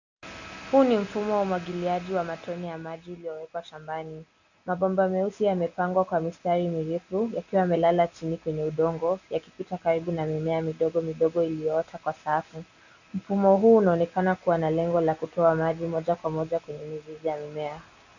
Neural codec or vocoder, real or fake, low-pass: none; real; 7.2 kHz